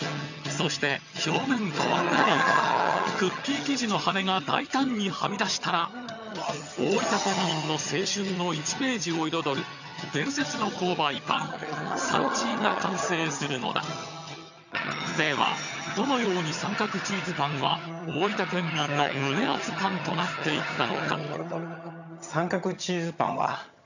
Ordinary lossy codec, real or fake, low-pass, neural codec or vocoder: none; fake; 7.2 kHz; vocoder, 22.05 kHz, 80 mel bands, HiFi-GAN